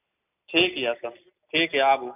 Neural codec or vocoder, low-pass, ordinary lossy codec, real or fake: none; 3.6 kHz; none; real